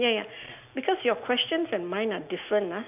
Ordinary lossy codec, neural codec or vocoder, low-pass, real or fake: none; none; 3.6 kHz; real